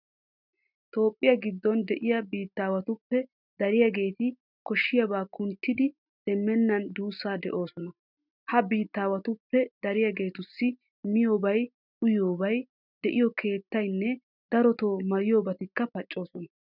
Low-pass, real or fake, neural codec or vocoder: 5.4 kHz; real; none